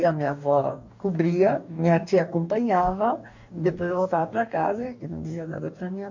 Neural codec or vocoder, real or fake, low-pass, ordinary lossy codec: codec, 44.1 kHz, 2.6 kbps, DAC; fake; 7.2 kHz; MP3, 48 kbps